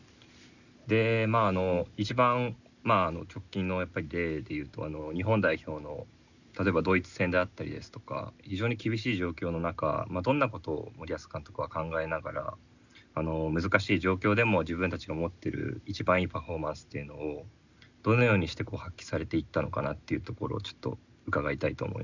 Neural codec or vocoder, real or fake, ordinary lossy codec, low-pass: none; real; none; 7.2 kHz